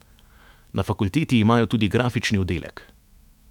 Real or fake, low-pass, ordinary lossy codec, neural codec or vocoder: fake; 19.8 kHz; none; autoencoder, 48 kHz, 128 numbers a frame, DAC-VAE, trained on Japanese speech